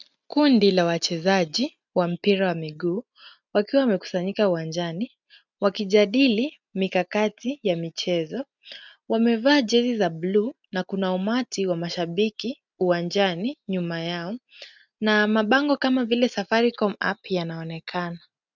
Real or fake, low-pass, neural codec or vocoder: real; 7.2 kHz; none